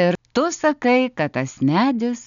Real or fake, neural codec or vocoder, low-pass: real; none; 7.2 kHz